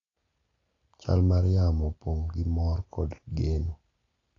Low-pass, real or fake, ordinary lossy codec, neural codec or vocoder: 7.2 kHz; real; AAC, 32 kbps; none